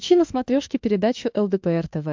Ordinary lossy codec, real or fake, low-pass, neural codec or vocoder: MP3, 64 kbps; fake; 7.2 kHz; autoencoder, 48 kHz, 32 numbers a frame, DAC-VAE, trained on Japanese speech